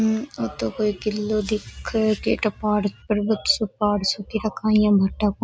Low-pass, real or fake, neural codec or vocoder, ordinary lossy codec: none; real; none; none